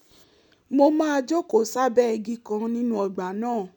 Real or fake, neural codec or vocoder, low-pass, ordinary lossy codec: real; none; 19.8 kHz; none